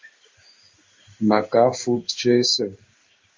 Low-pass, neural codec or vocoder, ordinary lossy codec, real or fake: 7.2 kHz; codec, 16 kHz in and 24 kHz out, 1 kbps, XY-Tokenizer; Opus, 32 kbps; fake